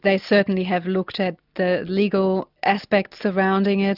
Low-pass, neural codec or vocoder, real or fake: 5.4 kHz; none; real